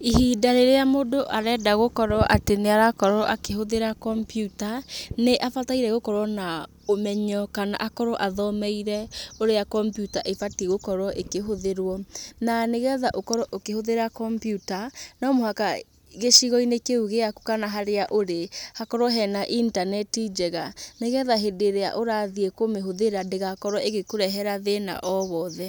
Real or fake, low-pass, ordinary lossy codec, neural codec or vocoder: real; none; none; none